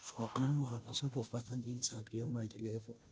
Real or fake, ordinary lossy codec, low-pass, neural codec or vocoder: fake; none; none; codec, 16 kHz, 0.5 kbps, FunCodec, trained on Chinese and English, 25 frames a second